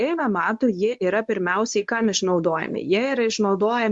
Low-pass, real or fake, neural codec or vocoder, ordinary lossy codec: 10.8 kHz; fake; codec, 24 kHz, 0.9 kbps, WavTokenizer, medium speech release version 1; MP3, 64 kbps